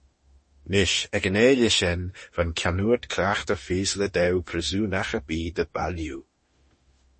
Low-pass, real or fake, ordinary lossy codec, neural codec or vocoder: 10.8 kHz; fake; MP3, 32 kbps; autoencoder, 48 kHz, 32 numbers a frame, DAC-VAE, trained on Japanese speech